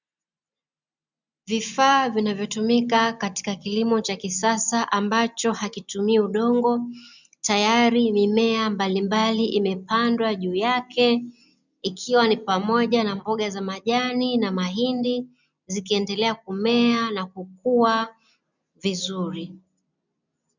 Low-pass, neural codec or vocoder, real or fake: 7.2 kHz; none; real